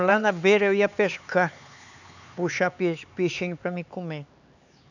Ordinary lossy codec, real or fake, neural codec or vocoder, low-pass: none; fake; codec, 16 kHz, 4 kbps, X-Codec, HuBERT features, trained on LibriSpeech; 7.2 kHz